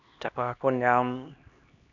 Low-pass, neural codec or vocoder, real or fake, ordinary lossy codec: 7.2 kHz; codec, 16 kHz, 1 kbps, X-Codec, HuBERT features, trained on LibriSpeech; fake; none